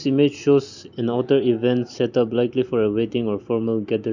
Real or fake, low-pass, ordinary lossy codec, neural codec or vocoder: real; 7.2 kHz; none; none